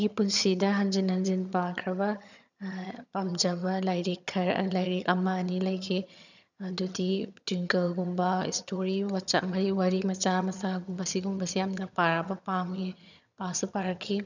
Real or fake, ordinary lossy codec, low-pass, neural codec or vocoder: fake; none; 7.2 kHz; vocoder, 22.05 kHz, 80 mel bands, HiFi-GAN